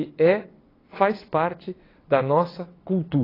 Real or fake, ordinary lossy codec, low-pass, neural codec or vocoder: fake; AAC, 24 kbps; 5.4 kHz; vocoder, 22.05 kHz, 80 mel bands, WaveNeXt